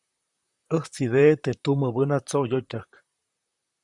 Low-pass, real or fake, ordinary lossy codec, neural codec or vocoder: 10.8 kHz; fake; Opus, 64 kbps; vocoder, 44.1 kHz, 128 mel bands, Pupu-Vocoder